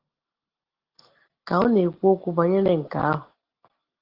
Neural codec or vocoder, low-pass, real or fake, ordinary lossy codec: none; 5.4 kHz; real; Opus, 16 kbps